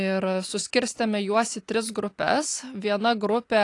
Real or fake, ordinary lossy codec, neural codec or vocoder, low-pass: real; AAC, 48 kbps; none; 10.8 kHz